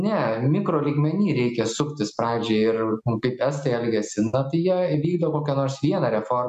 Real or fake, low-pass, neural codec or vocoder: real; 14.4 kHz; none